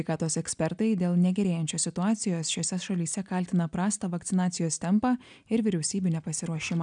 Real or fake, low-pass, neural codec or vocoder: real; 9.9 kHz; none